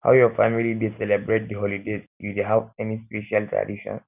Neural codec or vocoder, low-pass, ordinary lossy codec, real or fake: none; 3.6 kHz; none; real